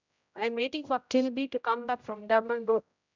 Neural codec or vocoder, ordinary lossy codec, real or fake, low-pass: codec, 16 kHz, 0.5 kbps, X-Codec, HuBERT features, trained on general audio; none; fake; 7.2 kHz